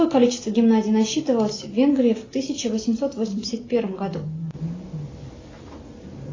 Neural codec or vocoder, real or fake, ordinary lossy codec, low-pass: none; real; AAC, 32 kbps; 7.2 kHz